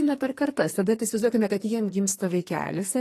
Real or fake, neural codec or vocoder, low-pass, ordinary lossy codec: fake; codec, 44.1 kHz, 2.6 kbps, SNAC; 14.4 kHz; AAC, 48 kbps